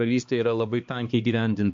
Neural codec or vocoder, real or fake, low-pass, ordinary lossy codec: codec, 16 kHz, 2 kbps, X-Codec, HuBERT features, trained on balanced general audio; fake; 7.2 kHz; MP3, 48 kbps